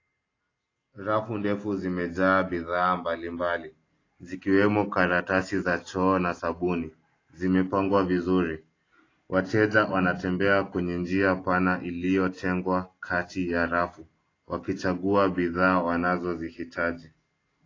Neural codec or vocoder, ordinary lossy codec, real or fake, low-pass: none; AAC, 32 kbps; real; 7.2 kHz